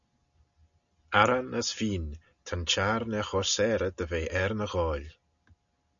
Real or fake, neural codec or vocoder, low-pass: real; none; 7.2 kHz